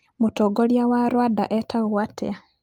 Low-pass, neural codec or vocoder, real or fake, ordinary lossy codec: 19.8 kHz; none; real; Opus, 32 kbps